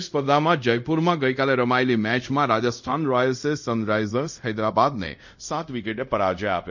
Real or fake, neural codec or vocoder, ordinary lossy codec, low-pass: fake; codec, 24 kHz, 0.5 kbps, DualCodec; none; 7.2 kHz